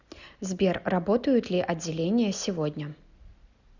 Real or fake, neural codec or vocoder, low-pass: real; none; 7.2 kHz